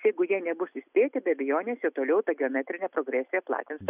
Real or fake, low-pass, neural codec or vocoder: real; 3.6 kHz; none